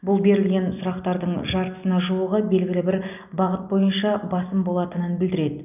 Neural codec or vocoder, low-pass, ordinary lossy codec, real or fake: none; 3.6 kHz; none; real